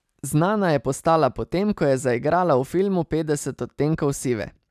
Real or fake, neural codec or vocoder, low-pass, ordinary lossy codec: real; none; 14.4 kHz; none